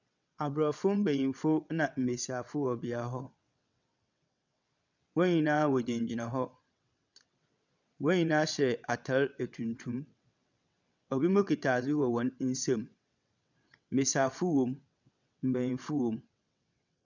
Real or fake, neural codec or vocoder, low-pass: fake; vocoder, 22.05 kHz, 80 mel bands, WaveNeXt; 7.2 kHz